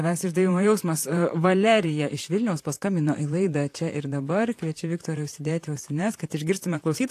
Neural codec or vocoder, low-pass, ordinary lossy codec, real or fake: vocoder, 44.1 kHz, 128 mel bands, Pupu-Vocoder; 14.4 kHz; AAC, 64 kbps; fake